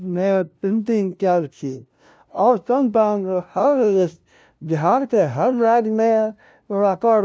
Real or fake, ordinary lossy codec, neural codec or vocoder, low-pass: fake; none; codec, 16 kHz, 0.5 kbps, FunCodec, trained on LibriTTS, 25 frames a second; none